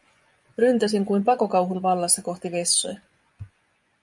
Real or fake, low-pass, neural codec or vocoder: fake; 10.8 kHz; vocoder, 24 kHz, 100 mel bands, Vocos